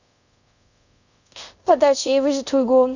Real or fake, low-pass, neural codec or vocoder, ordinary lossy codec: fake; 7.2 kHz; codec, 24 kHz, 0.5 kbps, DualCodec; none